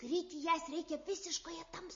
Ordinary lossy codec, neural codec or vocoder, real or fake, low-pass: MP3, 32 kbps; none; real; 7.2 kHz